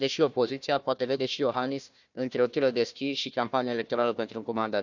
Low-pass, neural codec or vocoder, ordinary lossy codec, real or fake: 7.2 kHz; codec, 16 kHz, 1 kbps, FunCodec, trained on Chinese and English, 50 frames a second; none; fake